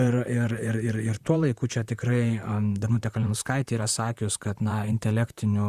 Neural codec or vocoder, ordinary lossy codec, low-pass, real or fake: vocoder, 44.1 kHz, 128 mel bands, Pupu-Vocoder; Opus, 64 kbps; 14.4 kHz; fake